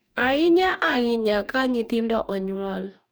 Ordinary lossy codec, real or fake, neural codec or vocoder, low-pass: none; fake; codec, 44.1 kHz, 2.6 kbps, DAC; none